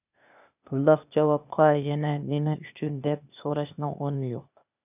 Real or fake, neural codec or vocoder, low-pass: fake; codec, 16 kHz, 0.8 kbps, ZipCodec; 3.6 kHz